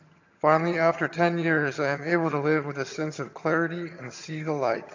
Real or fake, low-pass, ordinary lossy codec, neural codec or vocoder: fake; 7.2 kHz; AAC, 48 kbps; vocoder, 22.05 kHz, 80 mel bands, HiFi-GAN